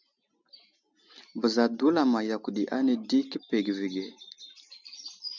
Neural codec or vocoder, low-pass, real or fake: none; 7.2 kHz; real